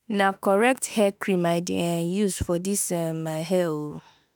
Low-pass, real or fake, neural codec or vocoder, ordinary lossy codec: none; fake; autoencoder, 48 kHz, 32 numbers a frame, DAC-VAE, trained on Japanese speech; none